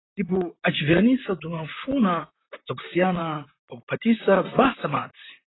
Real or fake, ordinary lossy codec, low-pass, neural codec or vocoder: fake; AAC, 16 kbps; 7.2 kHz; vocoder, 44.1 kHz, 128 mel bands, Pupu-Vocoder